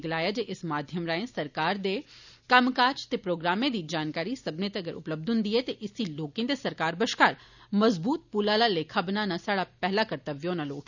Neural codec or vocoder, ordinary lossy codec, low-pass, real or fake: none; none; 7.2 kHz; real